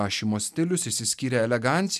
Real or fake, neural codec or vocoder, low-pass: real; none; 14.4 kHz